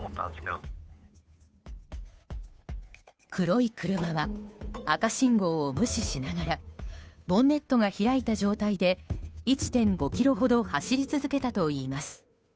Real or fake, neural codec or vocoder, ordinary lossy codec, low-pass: fake; codec, 16 kHz, 2 kbps, FunCodec, trained on Chinese and English, 25 frames a second; none; none